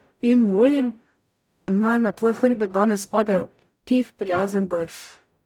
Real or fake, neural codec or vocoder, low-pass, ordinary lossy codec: fake; codec, 44.1 kHz, 0.9 kbps, DAC; 19.8 kHz; none